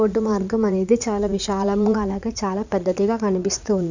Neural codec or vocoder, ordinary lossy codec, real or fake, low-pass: vocoder, 44.1 kHz, 80 mel bands, Vocos; none; fake; 7.2 kHz